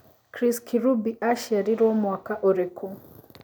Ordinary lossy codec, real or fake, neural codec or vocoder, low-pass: none; real; none; none